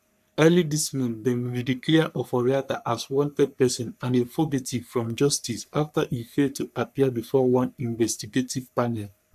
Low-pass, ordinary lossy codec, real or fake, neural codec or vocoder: 14.4 kHz; none; fake; codec, 44.1 kHz, 3.4 kbps, Pupu-Codec